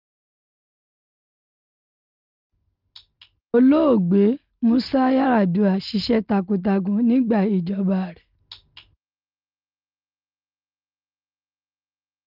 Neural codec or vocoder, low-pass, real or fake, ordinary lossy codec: none; 5.4 kHz; real; Opus, 32 kbps